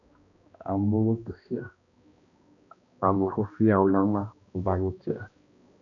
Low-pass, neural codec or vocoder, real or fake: 7.2 kHz; codec, 16 kHz, 1 kbps, X-Codec, HuBERT features, trained on balanced general audio; fake